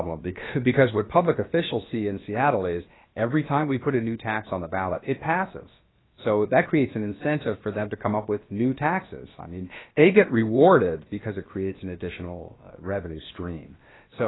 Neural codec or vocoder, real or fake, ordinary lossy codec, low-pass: codec, 16 kHz, about 1 kbps, DyCAST, with the encoder's durations; fake; AAC, 16 kbps; 7.2 kHz